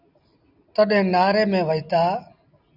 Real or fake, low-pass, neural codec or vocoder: real; 5.4 kHz; none